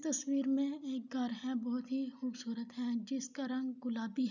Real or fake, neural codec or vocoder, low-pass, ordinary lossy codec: real; none; 7.2 kHz; none